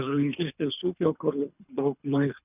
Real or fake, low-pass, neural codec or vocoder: fake; 3.6 kHz; codec, 24 kHz, 3 kbps, HILCodec